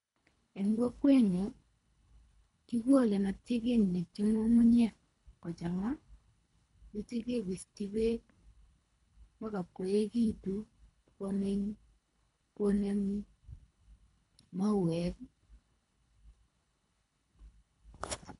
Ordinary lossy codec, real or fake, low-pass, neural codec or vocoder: none; fake; 10.8 kHz; codec, 24 kHz, 3 kbps, HILCodec